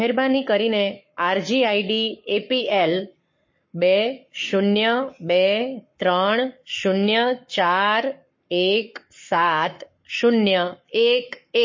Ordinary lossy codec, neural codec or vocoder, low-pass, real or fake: MP3, 32 kbps; codec, 44.1 kHz, 7.8 kbps, Pupu-Codec; 7.2 kHz; fake